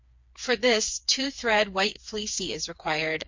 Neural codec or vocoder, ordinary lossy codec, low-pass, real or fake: codec, 16 kHz, 4 kbps, FreqCodec, smaller model; MP3, 48 kbps; 7.2 kHz; fake